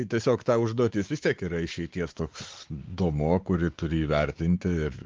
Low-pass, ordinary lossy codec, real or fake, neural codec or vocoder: 7.2 kHz; Opus, 24 kbps; fake; codec, 16 kHz, 4 kbps, X-Codec, WavLM features, trained on Multilingual LibriSpeech